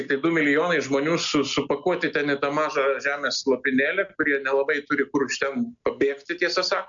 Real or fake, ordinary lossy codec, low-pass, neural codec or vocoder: real; MP3, 64 kbps; 7.2 kHz; none